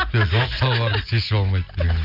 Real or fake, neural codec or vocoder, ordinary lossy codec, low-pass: real; none; AAC, 48 kbps; 5.4 kHz